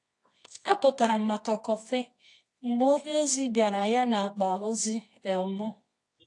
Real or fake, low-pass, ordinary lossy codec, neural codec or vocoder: fake; 10.8 kHz; AAC, 48 kbps; codec, 24 kHz, 0.9 kbps, WavTokenizer, medium music audio release